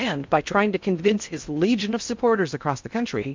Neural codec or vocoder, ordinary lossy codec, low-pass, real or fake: codec, 16 kHz in and 24 kHz out, 0.6 kbps, FocalCodec, streaming, 4096 codes; MP3, 64 kbps; 7.2 kHz; fake